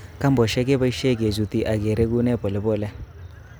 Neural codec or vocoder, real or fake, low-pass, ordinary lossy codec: none; real; none; none